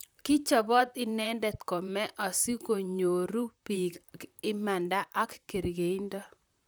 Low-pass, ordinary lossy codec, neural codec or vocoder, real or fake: none; none; vocoder, 44.1 kHz, 128 mel bands, Pupu-Vocoder; fake